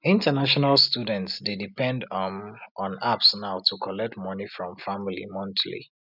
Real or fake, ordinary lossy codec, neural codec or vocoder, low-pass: real; none; none; 5.4 kHz